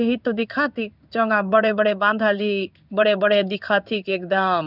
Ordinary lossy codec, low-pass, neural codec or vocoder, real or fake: none; 5.4 kHz; codec, 16 kHz in and 24 kHz out, 1 kbps, XY-Tokenizer; fake